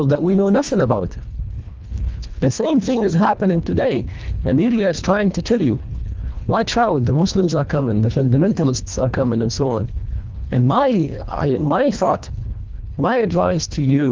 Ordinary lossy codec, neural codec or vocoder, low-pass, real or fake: Opus, 24 kbps; codec, 24 kHz, 1.5 kbps, HILCodec; 7.2 kHz; fake